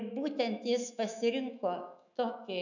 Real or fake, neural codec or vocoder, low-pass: fake; autoencoder, 48 kHz, 128 numbers a frame, DAC-VAE, trained on Japanese speech; 7.2 kHz